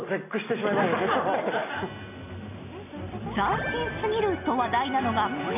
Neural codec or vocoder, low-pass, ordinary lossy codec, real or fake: none; 3.6 kHz; none; real